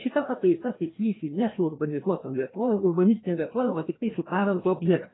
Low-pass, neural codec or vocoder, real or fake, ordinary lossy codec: 7.2 kHz; codec, 16 kHz, 1 kbps, FreqCodec, larger model; fake; AAC, 16 kbps